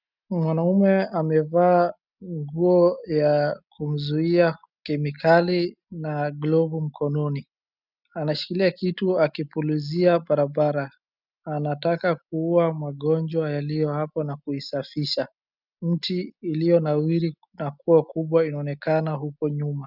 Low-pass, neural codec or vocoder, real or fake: 5.4 kHz; none; real